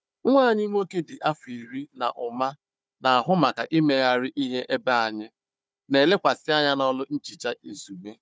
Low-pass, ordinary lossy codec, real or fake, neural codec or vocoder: none; none; fake; codec, 16 kHz, 4 kbps, FunCodec, trained on Chinese and English, 50 frames a second